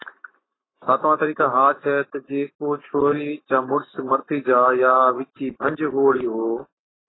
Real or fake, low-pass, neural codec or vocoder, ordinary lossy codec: fake; 7.2 kHz; codec, 44.1 kHz, 7.8 kbps, Pupu-Codec; AAC, 16 kbps